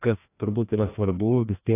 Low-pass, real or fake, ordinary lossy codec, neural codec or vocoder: 3.6 kHz; fake; AAC, 24 kbps; codec, 16 kHz, 1 kbps, FreqCodec, larger model